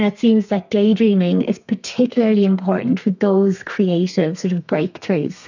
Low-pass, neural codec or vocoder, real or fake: 7.2 kHz; codec, 32 kHz, 1.9 kbps, SNAC; fake